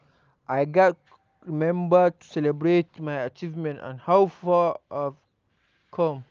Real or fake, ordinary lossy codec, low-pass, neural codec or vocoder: real; Opus, 24 kbps; 7.2 kHz; none